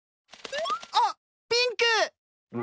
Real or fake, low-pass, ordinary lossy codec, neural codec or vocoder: real; none; none; none